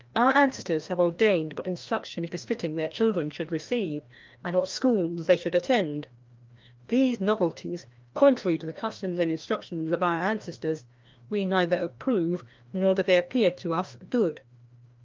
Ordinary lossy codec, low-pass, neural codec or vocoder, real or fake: Opus, 32 kbps; 7.2 kHz; codec, 16 kHz, 1 kbps, FreqCodec, larger model; fake